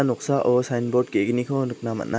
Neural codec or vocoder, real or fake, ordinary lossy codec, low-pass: none; real; none; none